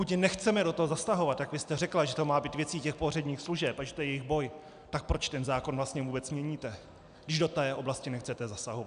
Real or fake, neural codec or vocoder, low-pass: real; none; 9.9 kHz